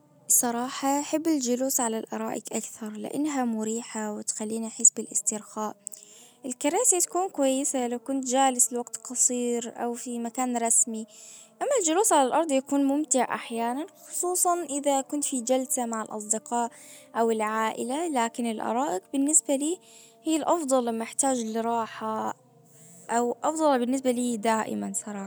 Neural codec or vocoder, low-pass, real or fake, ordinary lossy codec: none; none; real; none